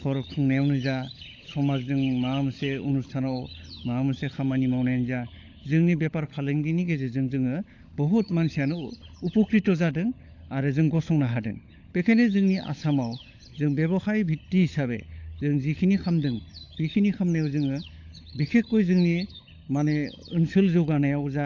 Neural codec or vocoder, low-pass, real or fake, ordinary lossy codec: codec, 16 kHz, 16 kbps, FunCodec, trained on LibriTTS, 50 frames a second; 7.2 kHz; fake; AAC, 48 kbps